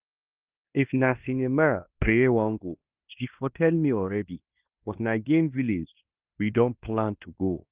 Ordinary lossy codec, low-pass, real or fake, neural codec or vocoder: Opus, 24 kbps; 3.6 kHz; fake; codec, 16 kHz in and 24 kHz out, 0.9 kbps, LongCat-Audio-Codec, fine tuned four codebook decoder